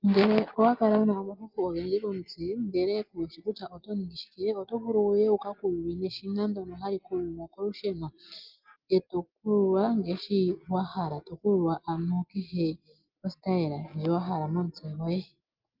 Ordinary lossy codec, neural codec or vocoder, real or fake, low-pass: Opus, 32 kbps; none; real; 5.4 kHz